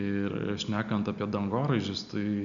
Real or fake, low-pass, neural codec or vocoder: real; 7.2 kHz; none